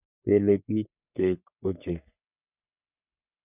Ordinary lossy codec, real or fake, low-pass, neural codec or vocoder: none; fake; 3.6 kHz; codec, 44.1 kHz, 3.4 kbps, Pupu-Codec